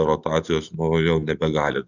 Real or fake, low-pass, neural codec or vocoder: real; 7.2 kHz; none